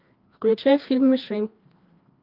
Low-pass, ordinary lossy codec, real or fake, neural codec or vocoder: 5.4 kHz; Opus, 32 kbps; fake; codec, 16 kHz, 2 kbps, FreqCodec, larger model